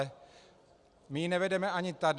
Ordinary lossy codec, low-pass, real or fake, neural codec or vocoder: Opus, 64 kbps; 10.8 kHz; real; none